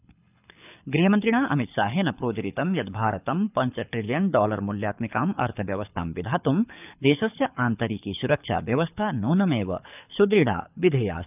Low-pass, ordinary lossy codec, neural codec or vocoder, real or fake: 3.6 kHz; none; codec, 24 kHz, 6 kbps, HILCodec; fake